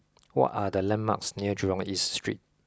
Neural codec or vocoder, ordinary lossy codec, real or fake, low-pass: none; none; real; none